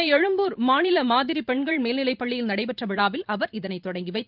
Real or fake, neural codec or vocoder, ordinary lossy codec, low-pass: fake; vocoder, 44.1 kHz, 128 mel bands every 512 samples, BigVGAN v2; Opus, 32 kbps; 5.4 kHz